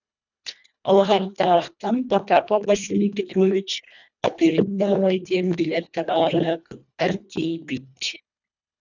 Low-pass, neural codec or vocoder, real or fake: 7.2 kHz; codec, 24 kHz, 1.5 kbps, HILCodec; fake